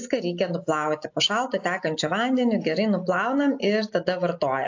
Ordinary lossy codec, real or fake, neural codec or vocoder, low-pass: AAC, 48 kbps; real; none; 7.2 kHz